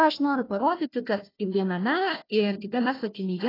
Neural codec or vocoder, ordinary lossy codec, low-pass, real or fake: codec, 16 kHz, 1 kbps, FunCodec, trained on Chinese and English, 50 frames a second; AAC, 24 kbps; 5.4 kHz; fake